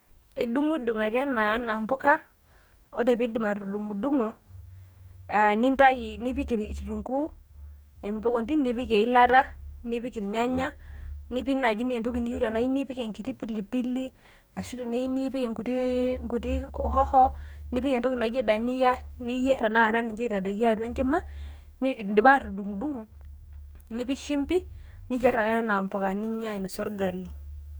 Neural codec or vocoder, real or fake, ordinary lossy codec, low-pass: codec, 44.1 kHz, 2.6 kbps, DAC; fake; none; none